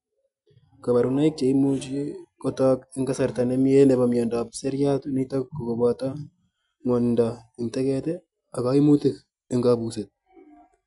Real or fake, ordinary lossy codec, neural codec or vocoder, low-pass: real; none; none; 10.8 kHz